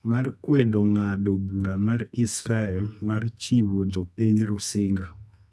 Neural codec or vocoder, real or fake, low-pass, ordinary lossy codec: codec, 24 kHz, 0.9 kbps, WavTokenizer, medium music audio release; fake; none; none